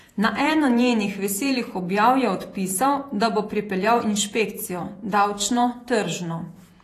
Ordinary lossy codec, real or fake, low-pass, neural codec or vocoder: AAC, 48 kbps; fake; 14.4 kHz; vocoder, 48 kHz, 128 mel bands, Vocos